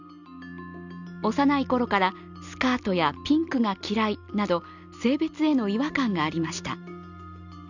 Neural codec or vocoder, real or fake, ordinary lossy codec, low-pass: none; real; none; 7.2 kHz